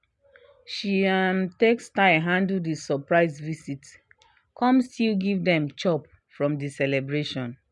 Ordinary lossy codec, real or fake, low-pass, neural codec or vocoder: none; real; 10.8 kHz; none